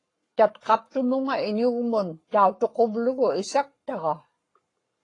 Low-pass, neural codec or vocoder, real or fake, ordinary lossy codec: 10.8 kHz; codec, 44.1 kHz, 7.8 kbps, Pupu-Codec; fake; AAC, 32 kbps